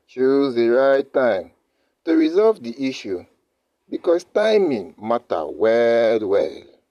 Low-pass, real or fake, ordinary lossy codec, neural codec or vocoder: 14.4 kHz; fake; none; vocoder, 44.1 kHz, 128 mel bands, Pupu-Vocoder